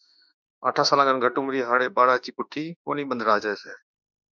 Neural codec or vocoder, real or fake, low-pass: autoencoder, 48 kHz, 32 numbers a frame, DAC-VAE, trained on Japanese speech; fake; 7.2 kHz